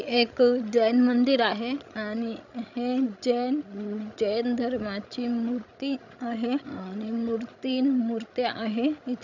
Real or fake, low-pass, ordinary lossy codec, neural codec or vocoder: fake; 7.2 kHz; none; codec, 16 kHz, 16 kbps, FreqCodec, larger model